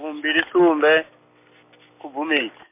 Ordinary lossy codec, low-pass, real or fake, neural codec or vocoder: MP3, 24 kbps; 3.6 kHz; real; none